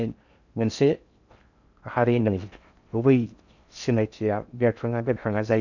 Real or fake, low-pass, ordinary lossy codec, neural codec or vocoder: fake; 7.2 kHz; none; codec, 16 kHz in and 24 kHz out, 0.6 kbps, FocalCodec, streaming, 4096 codes